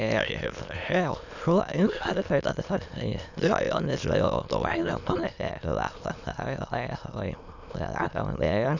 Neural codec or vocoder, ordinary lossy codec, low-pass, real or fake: autoencoder, 22.05 kHz, a latent of 192 numbers a frame, VITS, trained on many speakers; none; 7.2 kHz; fake